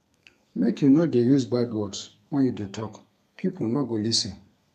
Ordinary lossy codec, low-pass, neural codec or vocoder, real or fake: none; 14.4 kHz; codec, 32 kHz, 1.9 kbps, SNAC; fake